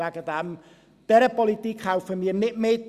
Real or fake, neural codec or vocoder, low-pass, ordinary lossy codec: real; none; 14.4 kHz; Opus, 64 kbps